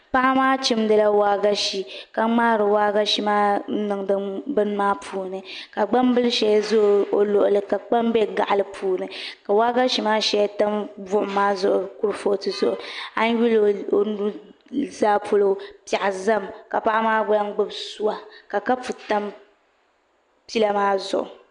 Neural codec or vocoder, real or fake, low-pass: none; real; 9.9 kHz